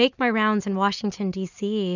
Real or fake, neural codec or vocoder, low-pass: real; none; 7.2 kHz